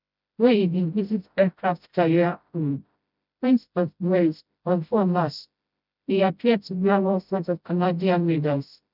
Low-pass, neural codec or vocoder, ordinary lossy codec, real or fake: 5.4 kHz; codec, 16 kHz, 0.5 kbps, FreqCodec, smaller model; none; fake